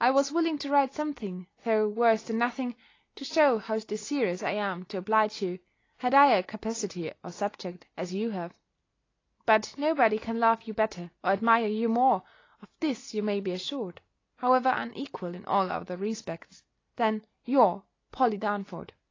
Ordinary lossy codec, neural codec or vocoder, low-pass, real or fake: AAC, 32 kbps; none; 7.2 kHz; real